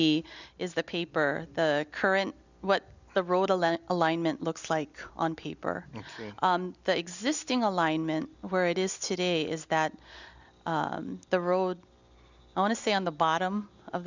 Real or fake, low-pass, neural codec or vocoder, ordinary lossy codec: real; 7.2 kHz; none; Opus, 64 kbps